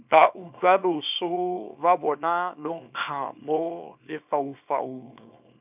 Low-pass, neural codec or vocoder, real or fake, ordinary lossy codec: 3.6 kHz; codec, 24 kHz, 0.9 kbps, WavTokenizer, small release; fake; none